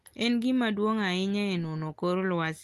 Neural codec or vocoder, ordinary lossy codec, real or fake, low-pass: none; Opus, 32 kbps; real; 14.4 kHz